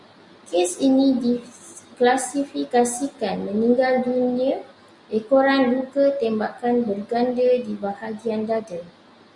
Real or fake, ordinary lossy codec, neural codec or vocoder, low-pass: real; Opus, 64 kbps; none; 10.8 kHz